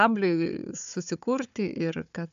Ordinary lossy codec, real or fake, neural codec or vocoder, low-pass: AAC, 96 kbps; fake; codec, 16 kHz, 4 kbps, FunCodec, trained on Chinese and English, 50 frames a second; 7.2 kHz